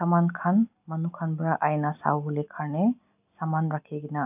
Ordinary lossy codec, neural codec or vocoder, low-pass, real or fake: none; none; 3.6 kHz; real